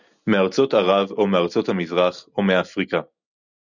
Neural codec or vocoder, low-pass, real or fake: none; 7.2 kHz; real